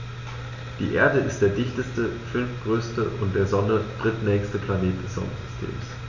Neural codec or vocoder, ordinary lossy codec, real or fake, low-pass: none; MP3, 48 kbps; real; 7.2 kHz